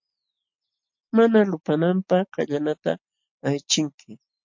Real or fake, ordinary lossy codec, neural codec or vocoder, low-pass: real; MP3, 48 kbps; none; 7.2 kHz